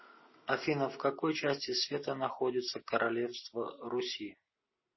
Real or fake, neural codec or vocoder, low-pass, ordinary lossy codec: real; none; 7.2 kHz; MP3, 24 kbps